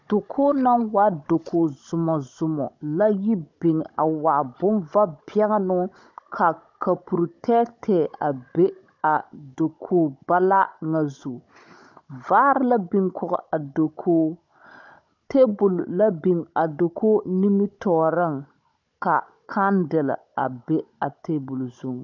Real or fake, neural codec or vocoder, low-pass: fake; codec, 16 kHz, 16 kbps, FunCodec, trained on Chinese and English, 50 frames a second; 7.2 kHz